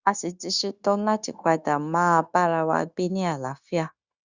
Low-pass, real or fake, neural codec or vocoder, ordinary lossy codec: 7.2 kHz; fake; codec, 16 kHz, 0.9 kbps, LongCat-Audio-Codec; Opus, 64 kbps